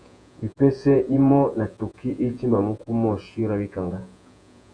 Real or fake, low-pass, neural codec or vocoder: fake; 9.9 kHz; vocoder, 48 kHz, 128 mel bands, Vocos